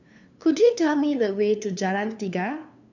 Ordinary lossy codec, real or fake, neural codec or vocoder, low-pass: none; fake; codec, 16 kHz, 2 kbps, FunCodec, trained on LibriTTS, 25 frames a second; 7.2 kHz